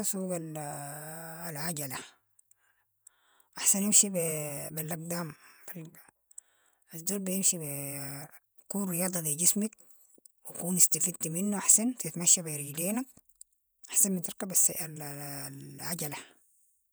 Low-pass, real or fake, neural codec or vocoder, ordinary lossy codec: none; fake; vocoder, 48 kHz, 128 mel bands, Vocos; none